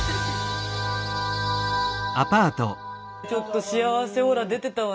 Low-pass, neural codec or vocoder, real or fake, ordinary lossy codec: none; none; real; none